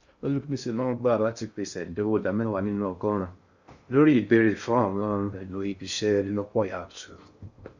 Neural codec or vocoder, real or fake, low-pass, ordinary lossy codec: codec, 16 kHz in and 24 kHz out, 0.6 kbps, FocalCodec, streaming, 2048 codes; fake; 7.2 kHz; Opus, 64 kbps